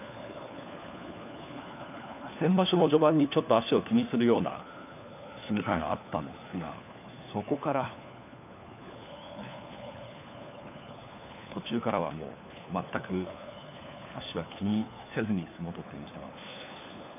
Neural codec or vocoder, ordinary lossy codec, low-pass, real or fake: codec, 16 kHz, 4 kbps, FunCodec, trained on LibriTTS, 50 frames a second; none; 3.6 kHz; fake